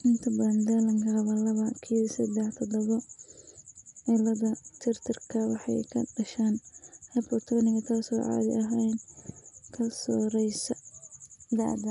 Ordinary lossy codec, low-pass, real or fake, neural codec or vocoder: none; 10.8 kHz; real; none